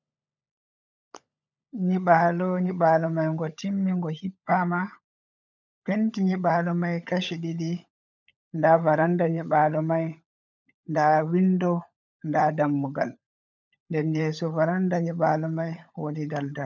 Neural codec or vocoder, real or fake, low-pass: codec, 16 kHz, 16 kbps, FunCodec, trained on LibriTTS, 50 frames a second; fake; 7.2 kHz